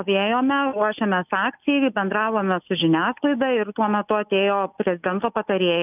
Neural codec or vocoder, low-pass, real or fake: none; 3.6 kHz; real